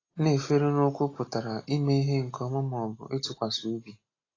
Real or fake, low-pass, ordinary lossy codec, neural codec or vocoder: real; 7.2 kHz; AAC, 32 kbps; none